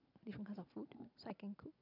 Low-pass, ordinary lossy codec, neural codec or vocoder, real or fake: 5.4 kHz; none; none; real